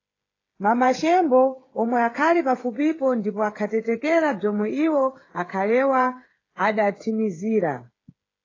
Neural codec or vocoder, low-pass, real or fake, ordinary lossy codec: codec, 16 kHz, 8 kbps, FreqCodec, smaller model; 7.2 kHz; fake; AAC, 32 kbps